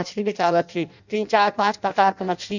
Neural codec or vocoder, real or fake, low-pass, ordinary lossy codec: codec, 16 kHz in and 24 kHz out, 0.6 kbps, FireRedTTS-2 codec; fake; 7.2 kHz; none